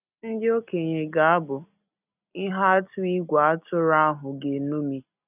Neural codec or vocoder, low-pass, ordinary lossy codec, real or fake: none; 3.6 kHz; none; real